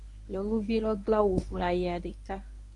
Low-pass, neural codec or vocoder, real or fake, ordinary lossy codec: 10.8 kHz; codec, 24 kHz, 0.9 kbps, WavTokenizer, medium speech release version 1; fake; MP3, 96 kbps